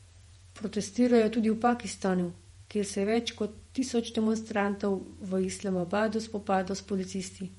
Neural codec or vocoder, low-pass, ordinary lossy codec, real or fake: vocoder, 48 kHz, 128 mel bands, Vocos; 19.8 kHz; MP3, 48 kbps; fake